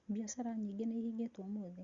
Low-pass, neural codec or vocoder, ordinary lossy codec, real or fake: 7.2 kHz; none; Opus, 64 kbps; real